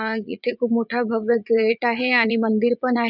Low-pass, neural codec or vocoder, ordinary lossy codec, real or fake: 5.4 kHz; vocoder, 44.1 kHz, 80 mel bands, Vocos; none; fake